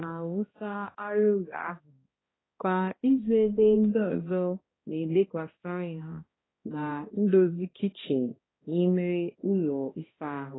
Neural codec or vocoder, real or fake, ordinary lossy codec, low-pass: codec, 16 kHz, 1 kbps, X-Codec, HuBERT features, trained on balanced general audio; fake; AAC, 16 kbps; 7.2 kHz